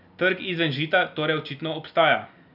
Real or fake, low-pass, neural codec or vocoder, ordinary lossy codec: real; 5.4 kHz; none; none